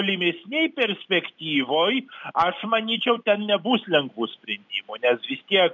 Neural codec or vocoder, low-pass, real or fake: none; 7.2 kHz; real